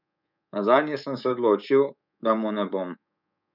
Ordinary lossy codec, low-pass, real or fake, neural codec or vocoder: none; 5.4 kHz; fake; codec, 24 kHz, 3.1 kbps, DualCodec